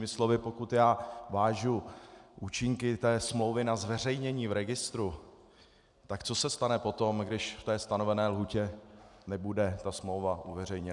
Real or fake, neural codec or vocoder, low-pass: real; none; 10.8 kHz